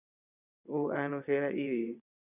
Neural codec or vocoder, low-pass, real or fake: vocoder, 22.05 kHz, 80 mel bands, WaveNeXt; 3.6 kHz; fake